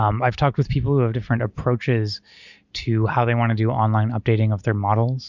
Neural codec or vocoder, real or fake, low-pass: none; real; 7.2 kHz